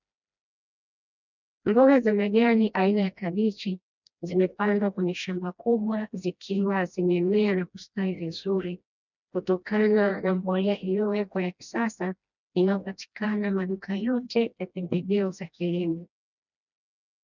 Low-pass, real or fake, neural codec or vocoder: 7.2 kHz; fake; codec, 16 kHz, 1 kbps, FreqCodec, smaller model